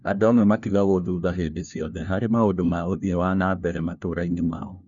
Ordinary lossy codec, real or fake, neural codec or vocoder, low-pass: none; fake; codec, 16 kHz, 1 kbps, FunCodec, trained on LibriTTS, 50 frames a second; 7.2 kHz